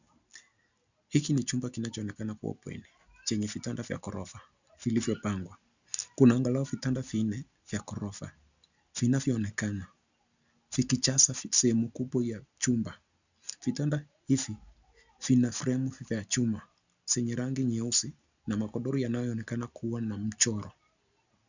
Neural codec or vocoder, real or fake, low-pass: none; real; 7.2 kHz